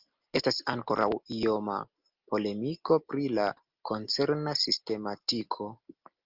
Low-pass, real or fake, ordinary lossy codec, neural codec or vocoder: 5.4 kHz; real; Opus, 24 kbps; none